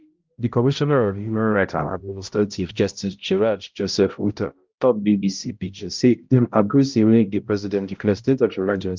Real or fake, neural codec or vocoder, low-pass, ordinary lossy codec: fake; codec, 16 kHz, 0.5 kbps, X-Codec, HuBERT features, trained on balanced general audio; 7.2 kHz; Opus, 24 kbps